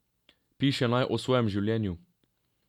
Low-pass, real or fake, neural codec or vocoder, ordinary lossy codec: 19.8 kHz; real; none; none